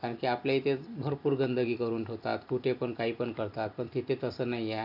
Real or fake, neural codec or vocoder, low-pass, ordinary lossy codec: real; none; 5.4 kHz; none